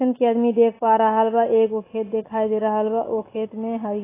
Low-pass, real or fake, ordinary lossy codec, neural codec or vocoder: 3.6 kHz; real; AAC, 16 kbps; none